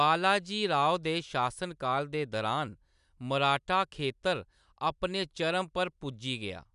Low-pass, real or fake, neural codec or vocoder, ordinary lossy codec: 10.8 kHz; real; none; none